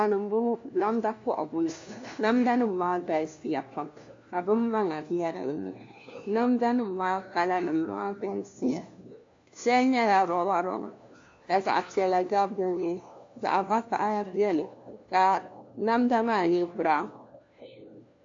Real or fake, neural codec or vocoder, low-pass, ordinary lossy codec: fake; codec, 16 kHz, 1 kbps, FunCodec, trained on LibriTTS, 50 frames a second; 7.2 kHz; AAC, 48 kbps